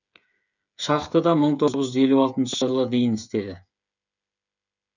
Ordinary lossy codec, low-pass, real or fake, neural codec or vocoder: none; 7.2 kHz; fake; codec, 16 kHz, 8 kbps, FreqCodec, smaller model